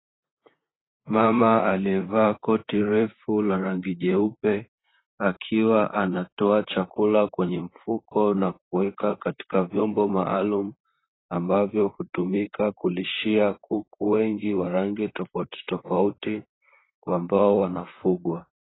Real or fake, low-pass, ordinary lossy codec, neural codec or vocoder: fake; 7.2 kHz; AAC, 16 kbps; vocoder, 44.1 kHz, 128 mel bands, Pupu-Vocoder